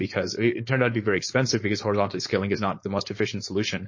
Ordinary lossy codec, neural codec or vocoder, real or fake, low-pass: MP3, 32 kbps; codec, 16 kHz, 4.8 kbps, FACodec; fake; 7.2 kHz